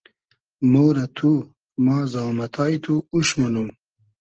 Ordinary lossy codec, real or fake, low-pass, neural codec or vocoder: Opus, 16 kbps; real; 7.2 kHz; none